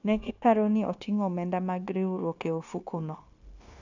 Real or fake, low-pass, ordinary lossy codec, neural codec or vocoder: fake; 7.2 kHz; AAC, 48 kbps; codec, 16 kHz, 0.9 kbps, LongCat-Audio-Codec